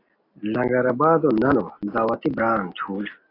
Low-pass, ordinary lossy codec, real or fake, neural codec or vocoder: 5.4 kHz; AAC, 32 kbps; real; none